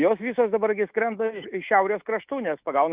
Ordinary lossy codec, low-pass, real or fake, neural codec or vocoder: Opus, 24 kbps; 3.6 kHz; fake; autoencoder, 48 kHz, 128 numbers a frame, DAC-VAE, trained on Japanese speech